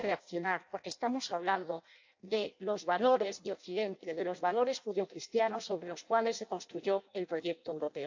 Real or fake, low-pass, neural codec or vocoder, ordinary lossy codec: fake; 7.2 kHz; codec, 16 kHz in and 24 kHz out, 0.6 kbps, FireRedTTS-2 codec; AAC, 48 kbps